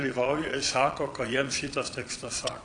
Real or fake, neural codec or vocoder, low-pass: fake; vocoder, 22.05 kHz, 80 mel bands, Vocos; 9.9 kHz